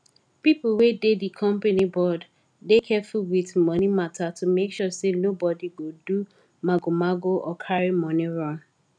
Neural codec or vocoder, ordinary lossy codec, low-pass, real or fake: none; none; 9.9 kHz; real